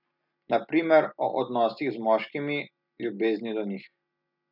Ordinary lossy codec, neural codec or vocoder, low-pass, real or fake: none; none; 5.4 kHz; real